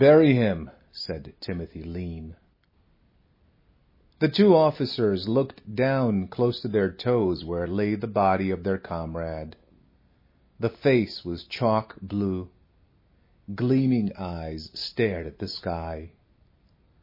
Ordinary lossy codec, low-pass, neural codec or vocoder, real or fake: MP3, 24 kbps; 5.4 kHz; none; real